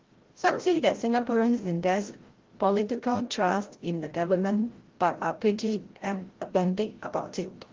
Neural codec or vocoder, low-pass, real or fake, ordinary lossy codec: codec, 16 kHz, 0.5 kbps, FreqCodec, larger model; 7.2 kHz; fake; Opus, 16 kbps